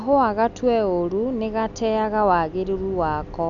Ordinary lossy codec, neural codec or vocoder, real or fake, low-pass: none; none; real; 7.2 kHz